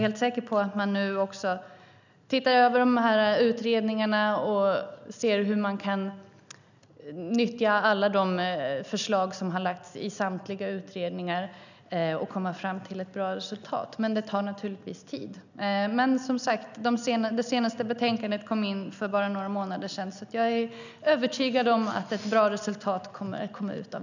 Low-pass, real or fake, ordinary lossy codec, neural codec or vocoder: 7.2 kHz; real; none; none